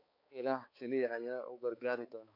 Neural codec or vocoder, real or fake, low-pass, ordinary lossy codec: codec, 16 kHz, 4 kbps, X-Codec, HuBERT features, trained on balanced general audio; fake; 5.4 kHz; MP3, 32 kbps